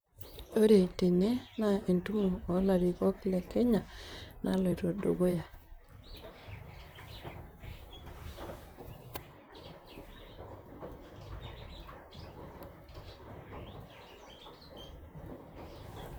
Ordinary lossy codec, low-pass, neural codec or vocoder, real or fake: none; none; vocoder, 44.1 kHz, 128 mel bands, Pupu-Vocoder; fake